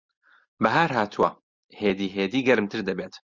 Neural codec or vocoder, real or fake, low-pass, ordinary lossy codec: none; real; 7.2 kHz; Opus, 64 kbps